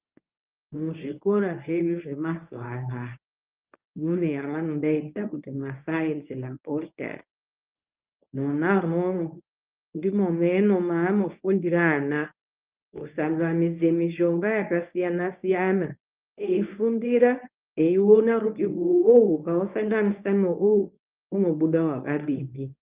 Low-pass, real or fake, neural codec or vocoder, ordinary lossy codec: 3.6 kHz; fake; codec, 24 kHz, 0.9 kbps, WavTokenizer, medium speech release version 1; Opus, 24 kbps